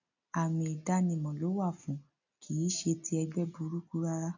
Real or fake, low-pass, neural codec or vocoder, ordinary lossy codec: real; 7.2 kHz; none; none